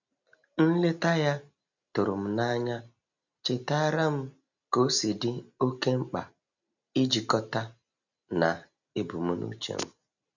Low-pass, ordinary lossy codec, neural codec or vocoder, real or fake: 7.2 kHz; none; none; real